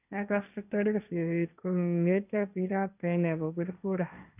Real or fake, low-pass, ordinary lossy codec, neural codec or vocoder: fake; 3.6 kHz; none; codec, 16 kHz, 1.1 kbps, Voila-Tokenizer